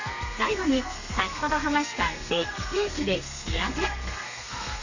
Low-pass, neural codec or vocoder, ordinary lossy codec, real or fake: 7.2 kHz; codec, 32 kHz, 1.9 kbps, SNAC; none; fake